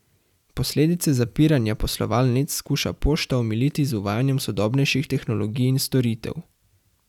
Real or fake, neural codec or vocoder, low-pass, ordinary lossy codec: real; none; 19.8 kHz; none